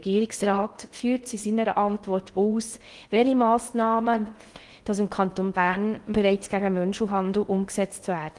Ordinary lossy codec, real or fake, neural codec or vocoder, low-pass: Opus, 24 kbps; fake; codec, 16 kHz in and 24 kHz out, 0.6 kbps, FocalCodec, streaming, 2048 codes; 10.8 kHz